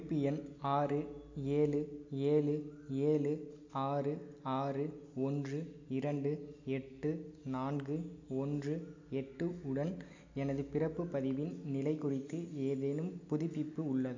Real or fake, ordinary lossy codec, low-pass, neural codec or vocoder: real; AAC, 48 kbps; 7.2 kHz; none